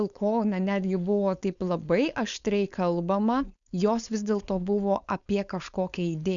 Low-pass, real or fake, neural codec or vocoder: 7.2 kHz; fake; codec, 16 kHz, 4.8 kbps, FACodec